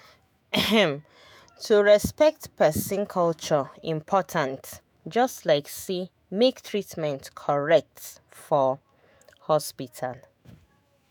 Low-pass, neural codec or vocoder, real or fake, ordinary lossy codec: none; autoencoder, 48 kHz, 128 numbers a frame, DAC-VAE, trained on Japanese speech; fake; none